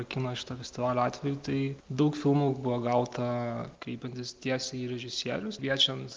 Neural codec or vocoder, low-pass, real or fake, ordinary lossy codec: none; 7.2 kHz; real; Opus, 32 kbps